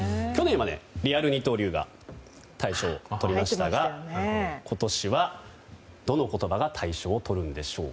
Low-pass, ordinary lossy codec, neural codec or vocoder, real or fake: none; none; none; real